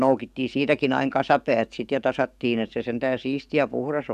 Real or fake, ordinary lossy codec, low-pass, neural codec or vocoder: fake; none; 14.4 kHz; codec, 44.1 kHz, 7.8 kbps, DAC